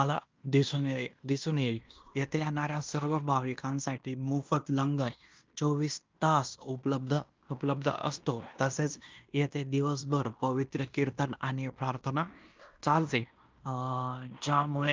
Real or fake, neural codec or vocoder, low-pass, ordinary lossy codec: fake; codec, 16 kHz in and 24 kHz out, 0.9 kbps, LongCat-Audio-Codec, fine tuned four codebook decoder; 7.2 kHz; Opus, 24 kbps